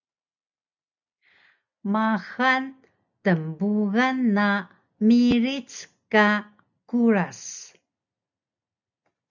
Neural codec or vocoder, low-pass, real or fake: none; 7.2 kHz; real